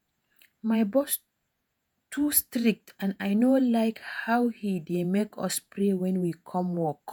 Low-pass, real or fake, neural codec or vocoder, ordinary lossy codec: none; fake; vocoder, 48 kHz, 128 mel bands, Vocos; none